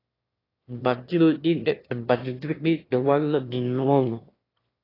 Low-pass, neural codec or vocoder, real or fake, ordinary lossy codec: 5.4 kHz; autoencoder, 22.05 kHz, a latent of 192 numbers a frame, VITS, trained on one speaker; fake; AAC, 24 kbps